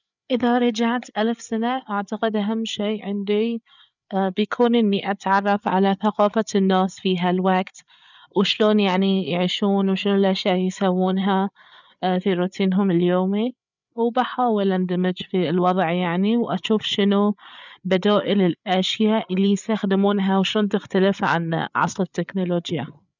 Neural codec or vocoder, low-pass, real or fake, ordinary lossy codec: codec, 16 kHz, 8 kbps, FreqCodec, larger model; 7.2 kHz; fake; none